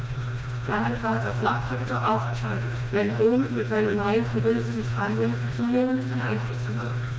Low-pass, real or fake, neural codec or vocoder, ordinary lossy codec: none; fake; codec, 16 kHz, 1 kbps, FreqCodec, smaller model; none